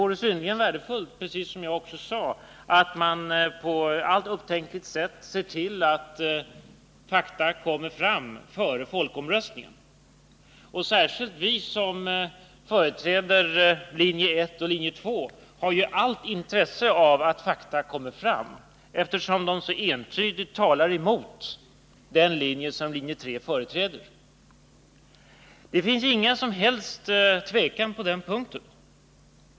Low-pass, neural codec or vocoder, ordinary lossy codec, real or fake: none; none; none; real